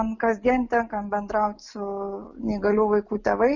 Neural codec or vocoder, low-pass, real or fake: none; 7.2 kHz; real